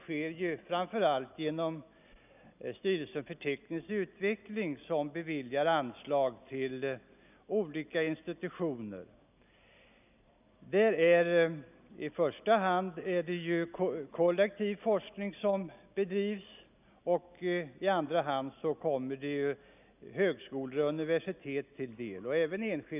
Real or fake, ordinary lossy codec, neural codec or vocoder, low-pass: real; none; none; 3.6 kHz